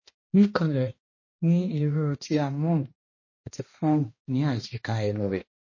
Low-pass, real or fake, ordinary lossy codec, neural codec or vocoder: 7.2 kHz; fake; MP3, 32 kbps; codec, 16 kHz, 1 kbps, X-Codec, HuBERT features, trained on balanced general audio